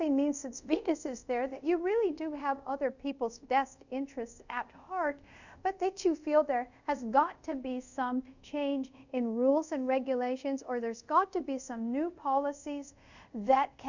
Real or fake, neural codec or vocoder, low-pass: fake; codec, 24 kHz, 0.5 kbps, DualCodec; 7.2 kHz